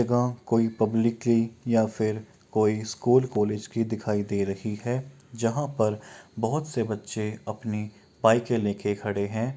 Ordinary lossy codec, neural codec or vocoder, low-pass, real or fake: none; none; none; real